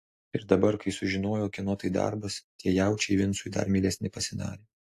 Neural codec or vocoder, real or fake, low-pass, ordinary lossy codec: none; real; 14.4 kHz; AAC, 64 kbps